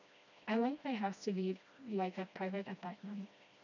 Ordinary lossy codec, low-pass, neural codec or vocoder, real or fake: none; 7.2 kHz; codec, 16 kHz, 1 kbps, FreqCodec, smaller model; fake